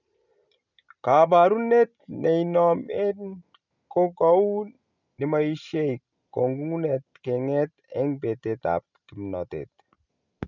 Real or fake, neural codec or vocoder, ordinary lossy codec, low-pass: real; none; none; 7.2 kHz